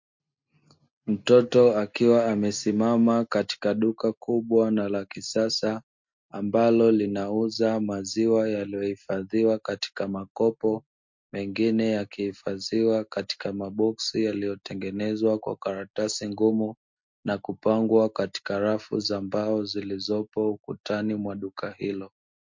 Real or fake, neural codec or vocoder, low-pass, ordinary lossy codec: real; none; 7.2 kHz; MP3, 48 kbps